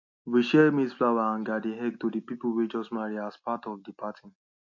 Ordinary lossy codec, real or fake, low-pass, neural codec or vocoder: AAC, 48 kbps; real; 7.2 kHz; none